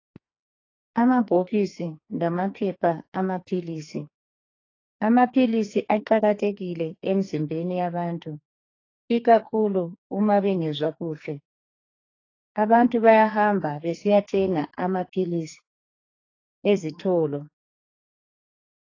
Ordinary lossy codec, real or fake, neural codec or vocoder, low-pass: AAC, 32 kbps; fake; codec, 44.1 kHz, 2.6 kbps, SNAC; 7.2 kHz